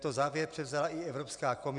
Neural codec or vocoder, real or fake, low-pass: none; real; 10.8 kHz